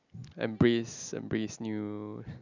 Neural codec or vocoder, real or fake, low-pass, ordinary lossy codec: none; real; 7.2 kHz; none